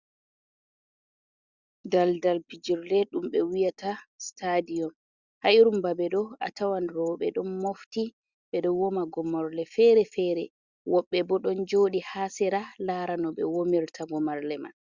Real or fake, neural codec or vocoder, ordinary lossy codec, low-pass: real; none; Opus, 64 kbps; 7.2 kHz